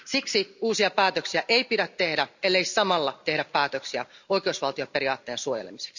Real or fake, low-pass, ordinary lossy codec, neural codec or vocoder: real; 7.2 kHz; none; none